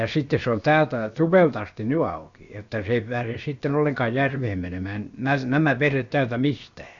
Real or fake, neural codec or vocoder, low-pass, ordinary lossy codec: fake; codec, 16 kHz, about 1 kbps, DyCAST, with the encoder's durations; 7.2 kHz; Opus, 64 kbps